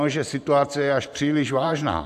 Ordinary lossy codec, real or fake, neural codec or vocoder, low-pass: AAC, 96 kbps; fake; vocoder, 44.1 kHz, 128 mel bands every 512 samples, BigVGAN v2; 14.4 kHz